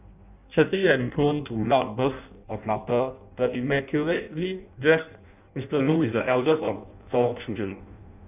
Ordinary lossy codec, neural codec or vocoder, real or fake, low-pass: none; codec, 16 kHz in and 24 kHz out, 0.6 kbps, FireRedTTS-2 codec; fake; 3.6 kHz